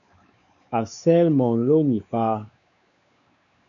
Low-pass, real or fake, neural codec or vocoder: 7.2 kHz; fake; codec, 16 kHz, 4 kbps, X-Codec, WavLM features, trained on Multilingual LibriSpeech